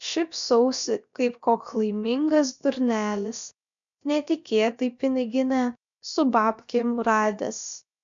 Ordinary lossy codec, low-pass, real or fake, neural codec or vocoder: MP3, 64 kbps; 7.2 kHz; fake; codec, 16 kHz, about 1 kbps, DyCAST, with the encoder's durations